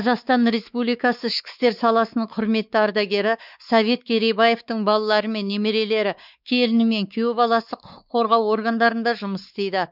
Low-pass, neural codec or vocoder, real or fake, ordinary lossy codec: 5.4 kHz; codec, 16 kHz, 4 kbps, X-Codec, WavLM features, trained on Multilingual LibriSpeech; fake; none